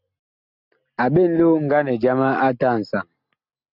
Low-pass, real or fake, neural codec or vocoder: 5.4 kHz; real; none